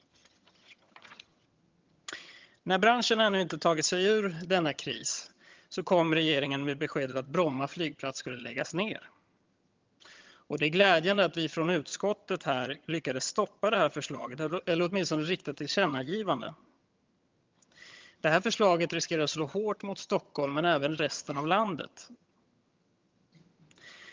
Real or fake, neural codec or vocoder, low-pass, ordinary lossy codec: fake; vocoder, 22.05 kHz, 80 mel bands, HiFi-GAN; 7.2 kHz; Opus, 24 kbps